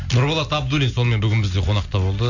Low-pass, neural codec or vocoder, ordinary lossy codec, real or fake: 7.2 kHz; none; none; real